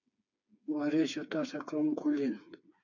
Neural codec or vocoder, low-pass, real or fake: codec, 16 kHz, 4 kbps, FreqCodec, smaller model; 7.2 kHz; fake